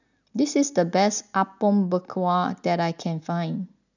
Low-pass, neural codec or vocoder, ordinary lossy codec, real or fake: 7.2 kHz; none; none; real